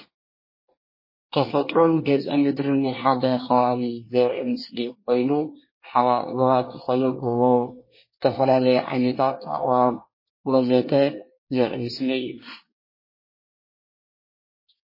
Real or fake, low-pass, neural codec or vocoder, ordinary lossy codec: fake; 5.4 kHz; codec, 24 kHz, 1 kbps, SNAC; MP3, 24 kbps